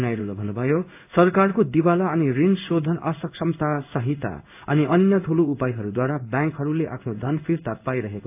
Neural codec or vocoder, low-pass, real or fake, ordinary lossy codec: codec, 16 kHz in and 24 kHz out, 1 kbps, XY-Tokenizer; 3.6 kHz; fake; AAC, 32 kbps